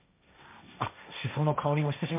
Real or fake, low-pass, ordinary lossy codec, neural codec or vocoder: fake; 3.6 kHz; MP3, 32 kbps; codec, 16 kHz, 1.1 kbps, Voila-Tokenizer